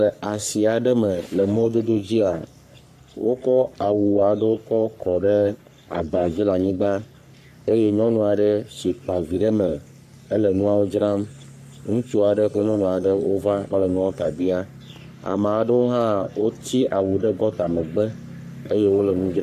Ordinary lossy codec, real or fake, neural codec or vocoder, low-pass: AAC, 96 kbps; fake; codec, 44.1 kHz, 3.4 kbps, Pupu-Codec; 14.4 kHz